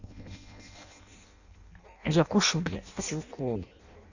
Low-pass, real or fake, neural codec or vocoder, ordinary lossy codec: 7.2 kHz; fake; codec, 16 kHz in and 24 kHz out, 0.6 kbps, FireRedTTS-2 codec; none